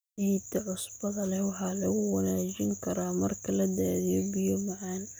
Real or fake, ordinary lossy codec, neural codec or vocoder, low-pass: real; none; none; none